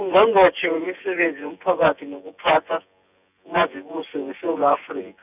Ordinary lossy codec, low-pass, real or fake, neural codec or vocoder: none; 3.6 kHz; fake; vocoder, 24 kHz, 100 mel bands, Vocos